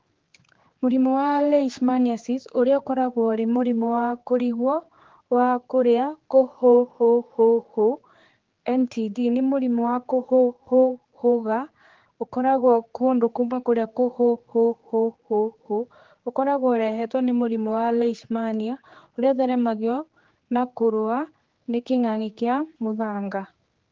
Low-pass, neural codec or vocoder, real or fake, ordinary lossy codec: 7.2 kHz; codec, 16 kHz, 4 kbps, X-Codec, HuBERT features, trained on general audio; fake; Opus, 16 kbps